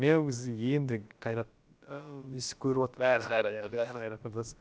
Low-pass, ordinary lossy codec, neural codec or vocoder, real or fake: none; none; codec, 16 kHz, about 1 kbps, DyCAST, with the encoder's durations; fake